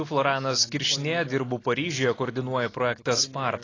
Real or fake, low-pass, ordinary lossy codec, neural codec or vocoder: real; 7.2 kHz; AAC, 32 kbps; none